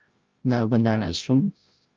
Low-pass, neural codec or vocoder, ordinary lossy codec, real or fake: 7.2 kHz; codec, 16 kHz, 0.5 kbps, FreqCodec, larger model; Opus, 24 kbps; fake